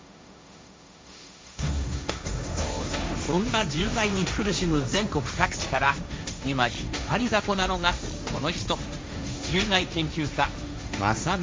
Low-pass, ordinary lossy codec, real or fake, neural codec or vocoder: none; none; fake; codec, 16 kHz, 1.1 kbps, Voila-Tokenizer